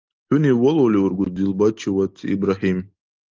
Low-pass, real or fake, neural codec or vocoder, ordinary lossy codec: 7.2 kHz; real; none; Opus, 32 kbps